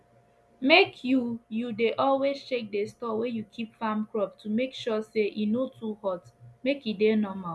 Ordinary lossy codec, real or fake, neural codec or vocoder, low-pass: none; real; none; none